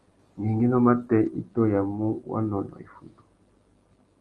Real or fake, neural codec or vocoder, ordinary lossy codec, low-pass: real; none; Opus, 24 kbps; 10.8 kHz